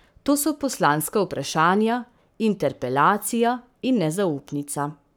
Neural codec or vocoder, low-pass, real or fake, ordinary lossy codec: codec, 44.1 kHz, 7.8 kbps, Pupu-Codec; none; fake; none